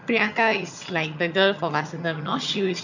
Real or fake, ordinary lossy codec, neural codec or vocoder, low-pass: fake; none; vocoder, 22.05 kHz, 80 mel bands, HiFi-GAN; 7.2 kHz